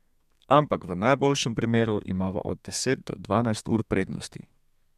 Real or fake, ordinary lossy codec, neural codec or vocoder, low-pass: fake; MP3, 96 kbps; codec, 32 kHz, 1.9 kbps, SNAC; 14.4 kHz